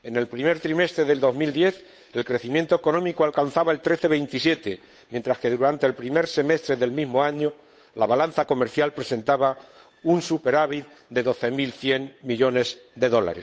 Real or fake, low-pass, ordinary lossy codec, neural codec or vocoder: fake; none; none; codec, 16 kHz, 8 kbps, FunCodec, trained on Chinese and English, 25 frames a second